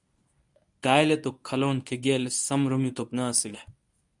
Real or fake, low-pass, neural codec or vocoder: fake; 10.8 kHz; codec, 24 kHz, 0.9 kbps, WavTokenizer, medium speech release version 1